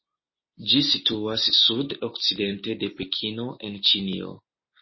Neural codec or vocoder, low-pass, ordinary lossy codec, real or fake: none; 7.2 kHz; MP3, 24 kbps; real